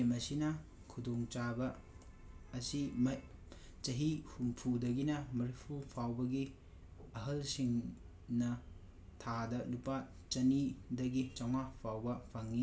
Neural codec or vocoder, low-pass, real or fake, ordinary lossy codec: none; none; real; none